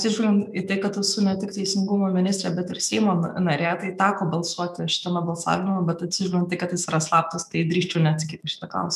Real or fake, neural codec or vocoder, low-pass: fake; autoencoder, 48 kHz, 128 numbers a frame, DAC-VAE, trained on Japanese speech; 14.4 kHz